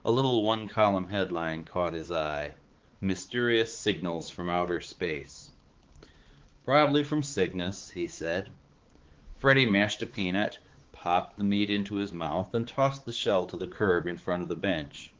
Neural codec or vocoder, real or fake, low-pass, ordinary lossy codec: codec, 16 kHz, 4 kbps, X-Codec, HuBERT features, trained on balanced general audio; fake; 7.2 kHz; Opus, 16 kbps